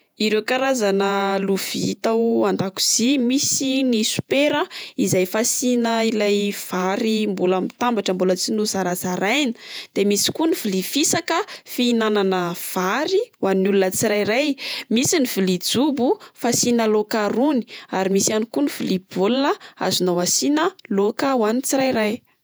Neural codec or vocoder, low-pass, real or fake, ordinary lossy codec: vocoder, 48 kHz, 128 mel bands, Vocos; none; fake; none